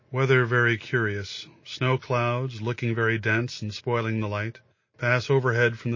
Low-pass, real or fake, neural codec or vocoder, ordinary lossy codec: 7.2 kHz; real; none; MP3, 32 kbps